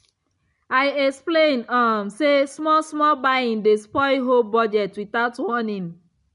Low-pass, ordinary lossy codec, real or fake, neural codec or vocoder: 10.8 kHz; MP3, 64 kbps; real; none